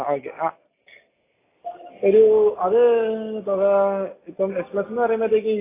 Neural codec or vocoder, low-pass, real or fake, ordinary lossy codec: none; 3.6 kHz; real; AAC, 16 kbps